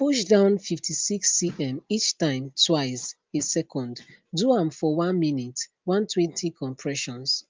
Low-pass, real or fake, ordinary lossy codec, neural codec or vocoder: 7.2 kHz; real; Opus, 32 kbps; none